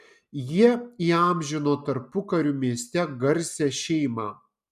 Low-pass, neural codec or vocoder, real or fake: 14.4 kHz; none; real